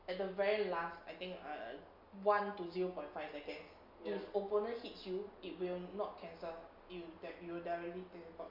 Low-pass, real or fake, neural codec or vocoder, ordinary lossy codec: 5.4 kHz; real; none; MP3, 48 kbps